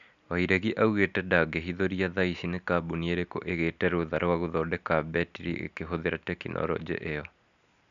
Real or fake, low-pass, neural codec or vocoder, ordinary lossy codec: real; 7.2 kHz; none; none